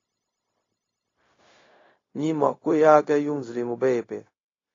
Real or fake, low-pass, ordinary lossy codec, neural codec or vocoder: fake; 7.2 kHz; AAC, 32 kbps; codec, 16 kHz, 0.4 kbps, LongCat-Audio-Codec